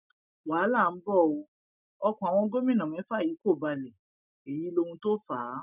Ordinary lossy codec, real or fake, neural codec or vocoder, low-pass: none; real; none; 3.6 kHz